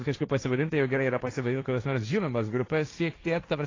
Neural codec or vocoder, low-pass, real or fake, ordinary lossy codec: codec, 16 kHz, 1.1 kbps, Voila-Tokenizer; 7.2 kHz; fake; AAC, 32 kbps